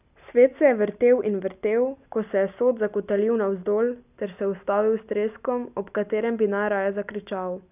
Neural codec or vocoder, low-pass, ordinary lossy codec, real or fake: none; 3.6 kHz; none; real